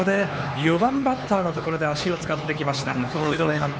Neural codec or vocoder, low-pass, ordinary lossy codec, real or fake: codec, 16 kHz, 4 kbps, X-Codec, HuBERT features, trained on LibriSpeech; none; none; fake